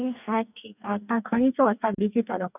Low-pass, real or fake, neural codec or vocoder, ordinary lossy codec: 3.6 kHz; fake; codec, 44.1 kHz, 2.6 kbps, DAC; none